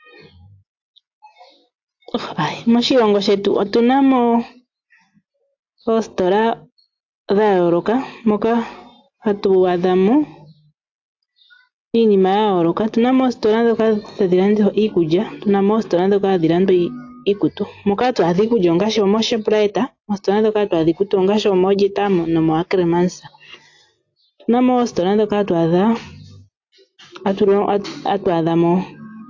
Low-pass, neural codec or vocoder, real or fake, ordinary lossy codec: 7.2 kHz; none; real; AAC, 48 kbps